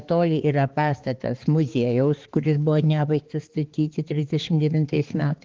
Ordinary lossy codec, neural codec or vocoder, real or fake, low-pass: Opus, 16 kbps; codec, 16 kHz, 4 kbps, X-Codec, HuBERT features, trained on balanced general audio; fake; 7.2 kHz